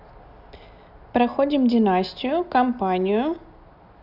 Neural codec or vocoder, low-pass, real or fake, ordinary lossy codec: none; 5.4 kHz; real; none